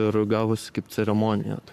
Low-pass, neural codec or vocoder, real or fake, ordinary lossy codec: 14.4 kHz; codec, 44.1 kHz, 7.8 kbps, Pupu-Codec; fake; AAC, 96 kbps